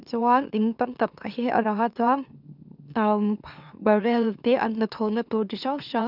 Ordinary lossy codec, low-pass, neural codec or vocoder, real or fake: none; 5.4 kHz; autoencoder, 44.1 kHz, a latent of 192 numbers a frame, MeloTTS; fake